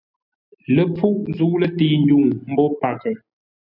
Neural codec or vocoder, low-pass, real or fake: none; 5.4 kHz; real